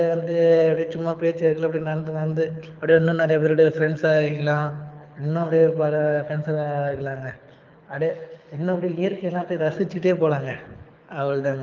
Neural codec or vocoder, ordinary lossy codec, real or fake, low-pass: codec, 24 kHz, 6 kbps, HILCodec; Opus, 32 kbps; fake; 7.2 kHz